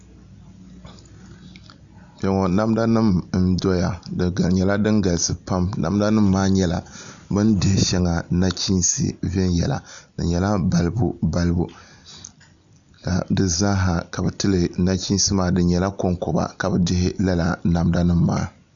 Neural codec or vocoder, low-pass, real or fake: none; 7.2 kHz; real